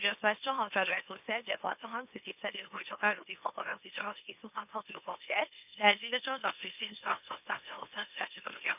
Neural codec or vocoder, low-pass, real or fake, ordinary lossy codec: autoencoder, 44.1 kHz, a latent of 192 numbers a frame, MeloTTS; 3.6 kHz; fake; none